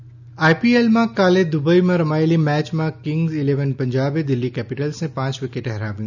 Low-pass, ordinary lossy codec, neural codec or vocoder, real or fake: 7.2 kHz; none; none; real